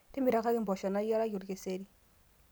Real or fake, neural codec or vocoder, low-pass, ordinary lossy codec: real; none; none; none